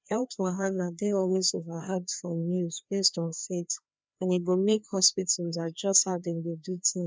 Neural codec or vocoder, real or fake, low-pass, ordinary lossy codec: codec, 16 kHz, 2 kbps, FreqCodec, larger model; fake; none; none